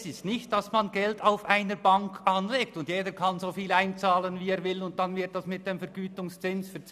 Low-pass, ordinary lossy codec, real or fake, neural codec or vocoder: 14.4 kHz; none; real; none